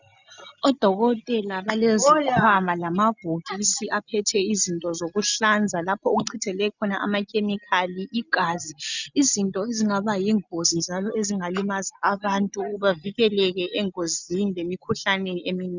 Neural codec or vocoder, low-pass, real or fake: none; 7.2 kHz; real